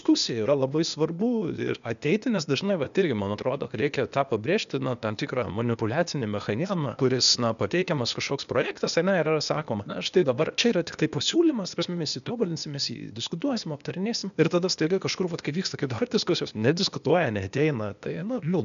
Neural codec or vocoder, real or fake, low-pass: codec, 16 kHz, 0.8 kbps, ZipCodec; fake; 7.2 kHz